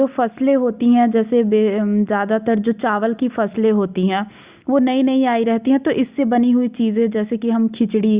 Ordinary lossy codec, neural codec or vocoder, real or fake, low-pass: Opus, 64 kbps; none; real; 3.6 kHz